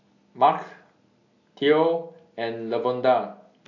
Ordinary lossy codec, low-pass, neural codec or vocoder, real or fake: AAC, 48 kbps; 7.2 kHz; none; real